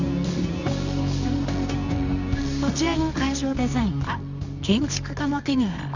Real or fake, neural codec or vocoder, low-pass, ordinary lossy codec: fake; codec, 24 kHz, 0.9 kbps, WavTokenizer, medium music audio release; 7.2 kHz; none